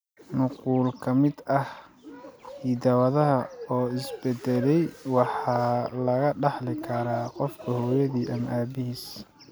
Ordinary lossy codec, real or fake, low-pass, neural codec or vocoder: none; real; none; none